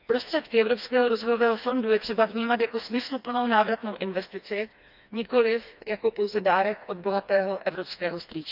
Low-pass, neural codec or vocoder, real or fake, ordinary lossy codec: 5.4 kHz; codec, 16 kHz, 2 kbps, FreqCodec, smaller model; fake; none